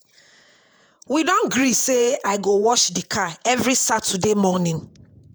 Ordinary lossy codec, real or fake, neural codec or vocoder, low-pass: none; fake; vocoder, 48 kHz, 128 mel bands, Vocos; none